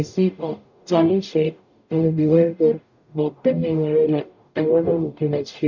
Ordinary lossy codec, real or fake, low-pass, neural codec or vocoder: none; fake; 7.2 kHz; codec, 44.1 kHz, 0.9 kbps, DAC